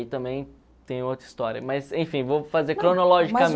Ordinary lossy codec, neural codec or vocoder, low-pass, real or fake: none; none; none; real